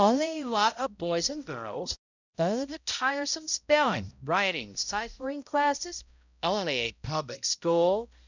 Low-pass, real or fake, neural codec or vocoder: 7.2 kHz; fake; codec, 16 kHz, 0.5 kbps, X-Codec, HuBERT features, trained on balanced general audio